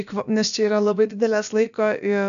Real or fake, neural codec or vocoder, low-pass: fake; codec, 16 kHz, about 1 kbps, DyCAST, with the encoder's durations; 7.2 kHz